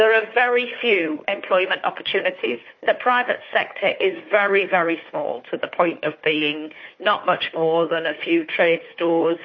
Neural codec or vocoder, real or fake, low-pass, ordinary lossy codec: codec, 16 kHz, 4 kbps, FunCodec, trained on Chinese and English, 50 frames a second; fake; 7.2 kHz; MP3, 32 kbps